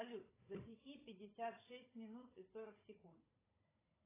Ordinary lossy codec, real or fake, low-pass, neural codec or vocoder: AAC, 16 kbps; fake; 3.6 kHz; codec, 16 kHz, 16 kbps, FunCodec, trained on Chinese and English, 50 frames a second